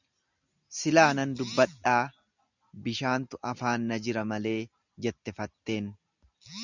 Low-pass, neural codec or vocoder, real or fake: 7.2 kHz; none; real